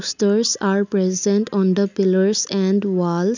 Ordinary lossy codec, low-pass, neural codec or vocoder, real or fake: none; 7.2 kHz; none; real